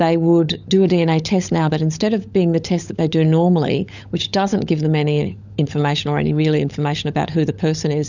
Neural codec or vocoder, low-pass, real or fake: codec, 16 kHz, 16 kbps, FunCodec, trained on LibriTTS, 50 frames a second; 7.2 kHz; fake